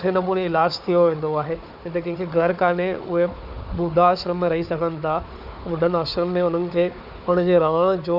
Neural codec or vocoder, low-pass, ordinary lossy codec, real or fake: codec, 16 kHz, 4 kbps, FunCodec, trained on Chinese and English, 50 frames a second; 5.4 kHz; none; fake